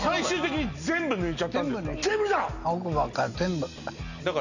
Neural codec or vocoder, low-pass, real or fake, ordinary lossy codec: none; 7.2 kHz; real; none